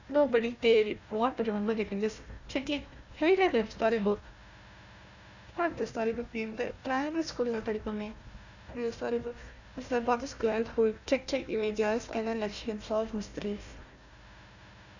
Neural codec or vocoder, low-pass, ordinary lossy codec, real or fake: codec, 16 kHz, 1 kbps, FunCodec, trained on Chinese and English, 50 frames a second; 7.2 kHz; none; fake